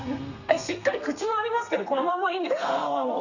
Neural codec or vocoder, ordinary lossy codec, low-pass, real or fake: codec, 32 kHz, 1.9 kbps, SNAC; none; 7.2 kHz; fake